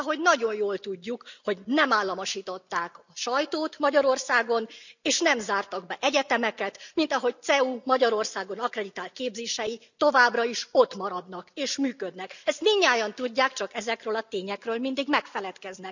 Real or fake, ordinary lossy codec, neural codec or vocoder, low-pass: real; none; none; 7.2 kHz